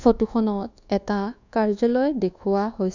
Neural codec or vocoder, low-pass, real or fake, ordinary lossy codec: codec, 24 kHz, 1.2 kbps, DualCodec; 7.2 kHz; fake; none